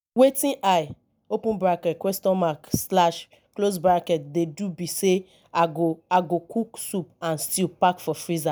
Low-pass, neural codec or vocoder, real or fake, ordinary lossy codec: none; none; real; none